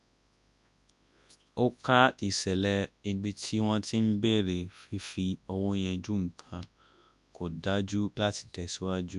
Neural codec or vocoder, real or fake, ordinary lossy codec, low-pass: codec, 24 kHz, 0.9 kbps, WavTokenizer, large speech release; fake; none; 10.8 kHz